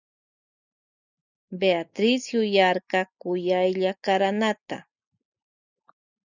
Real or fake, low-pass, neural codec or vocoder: real; 7.2 kHz; none